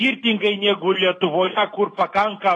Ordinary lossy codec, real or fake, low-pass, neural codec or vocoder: AAC, 32 kbps; real; 10.8 kHz; none